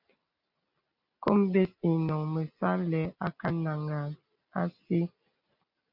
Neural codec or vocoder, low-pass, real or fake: none; 5.4 kHz; real